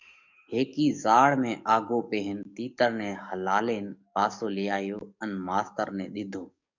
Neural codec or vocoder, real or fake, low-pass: codec, 44.1 kHz, 7.8 kbps, DAC; fake; 7.2 kHz